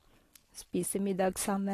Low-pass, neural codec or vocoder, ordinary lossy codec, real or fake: 14.4 kHz; none; AAC, 48 kbps; real